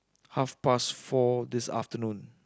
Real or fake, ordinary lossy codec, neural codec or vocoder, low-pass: real; none; none; none